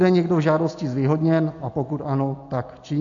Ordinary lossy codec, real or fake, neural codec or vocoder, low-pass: AAC, 48 kbps; real; none; 7.2 kHz